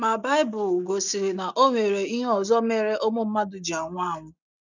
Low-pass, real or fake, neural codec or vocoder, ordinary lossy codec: 7.2 kHz; real; none; none